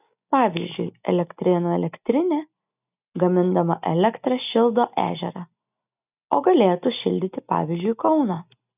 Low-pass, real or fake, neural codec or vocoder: 3.6 kHz; real; none